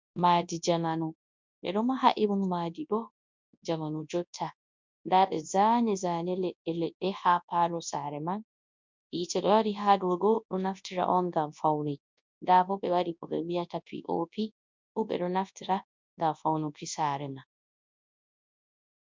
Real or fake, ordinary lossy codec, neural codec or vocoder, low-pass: fake; MP3, 64 kbps; codec, 24 kHz, 0.9 kbps, WavTokenizer, large speech release; 7.2 kHz